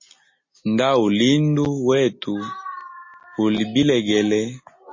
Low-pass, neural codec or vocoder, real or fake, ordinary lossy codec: 7.2 kHz; none; real; MP3, 32 kbps